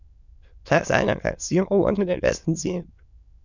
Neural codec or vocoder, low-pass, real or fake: autoencoder, 22.05 kHz, a latent of 192 numbers a frame, VITS, trained on many speakers; 7.2 kHz; fake